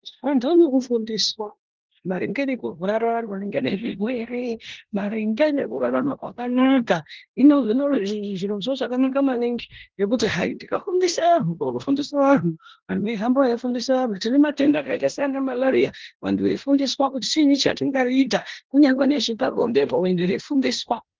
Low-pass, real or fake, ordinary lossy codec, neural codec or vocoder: 7.2 kHz; fake; Opus, 16 kbps; codec, 16 kHz in and 24 kHz out, 0.9 kbps, LongCat-Audio-Codec, four codebook decoder